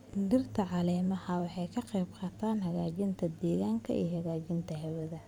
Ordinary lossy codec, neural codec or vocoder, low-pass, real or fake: none; vocoder, 44.1 kHz, 128 mel bands every 512 samples, BigVGAN v2; 19.8 kHz; fake